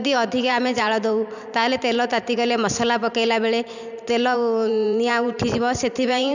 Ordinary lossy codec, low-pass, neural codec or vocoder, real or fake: none; 7.2 kHz; vocoder, 44.1 kHz, 128 mel bands every 512 samples, BigVGAN v2; fake